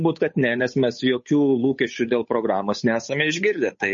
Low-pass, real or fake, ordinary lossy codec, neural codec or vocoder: 7.2 kHz; fake; MP3, 32 kbps; codec, 16 kHz, 8 kbps, FunCodec, trained on Chinese and English, 25 frames a second